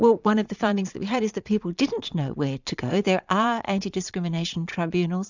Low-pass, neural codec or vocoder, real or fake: 7.2 kHz; vocoder, 22.05 kHz, 80 mel bands, Vocos; fake